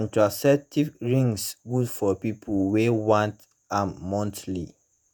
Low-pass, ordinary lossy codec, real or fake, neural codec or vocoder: none; none; fake; vocoder, 48 kHz, 128 mel bands, Vocos